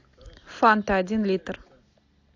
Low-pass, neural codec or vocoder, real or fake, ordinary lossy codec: 7.2 kHz; none; real; AAC, 48 kbps